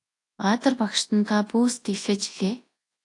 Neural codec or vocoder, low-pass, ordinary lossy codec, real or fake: codec, 24 kHz, 0.9 kbps, WavTokenizer, large speech release; 10.8 kHz; AAC, 48 kbps; fake